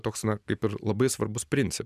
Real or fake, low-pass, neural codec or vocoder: fake; 14.4 kHz; vocoder, 44.1 kHz, 128 mel bands every 256 samples, BigVGAN v2